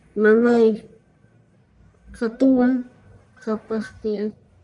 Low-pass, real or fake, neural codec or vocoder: 10.8 kHz; fake; codec, 44.1 kHz, 1.7 kbps, Pupu-Codec